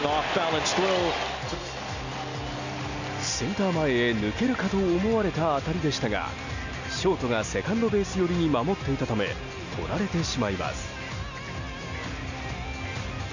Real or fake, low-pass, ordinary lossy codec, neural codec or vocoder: real; 7.2 kHz; none; none